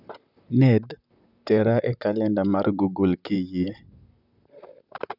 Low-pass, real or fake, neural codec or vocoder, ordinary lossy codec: 5.4 kHz; fake; vocoder, 44.1 kHz, 128 mel bands, Pupu-Vocoder; none